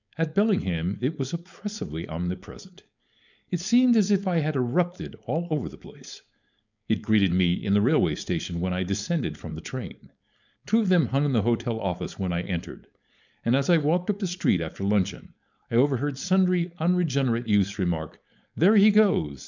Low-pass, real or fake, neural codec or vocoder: 7.2 kHz; fake; codec, 16 kHz, 4.8 kbps, FACodec